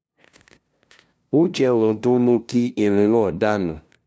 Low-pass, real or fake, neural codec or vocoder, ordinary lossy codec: none; fake; codec, 16 kHz, 0.5 kbps, FunCodec, trained on LibriTTS, 25 frames a second; none